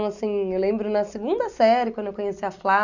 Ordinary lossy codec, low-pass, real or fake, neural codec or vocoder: none; 7.2 kHz; real; none